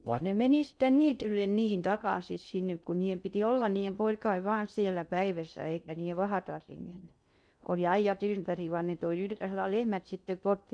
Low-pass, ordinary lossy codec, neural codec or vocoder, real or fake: 9.9 kHz; none; codec, 16 kHz in and 24 kHz out, 0.6 kbps, FocalCodec, streaming, 2048 codes; fake